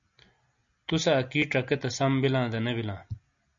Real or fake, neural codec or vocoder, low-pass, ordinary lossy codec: real; none; 7.2 kHz; MP3, 64 kbps